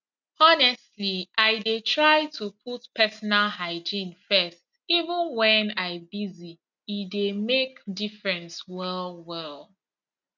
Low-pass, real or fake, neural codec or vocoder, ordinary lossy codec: 7.2 kHz; real; none; none